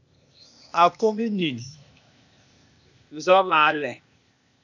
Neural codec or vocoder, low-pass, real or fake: codec, 16 kHz, 0.8 kbps, ZipCodec; 7.2 kHz; fake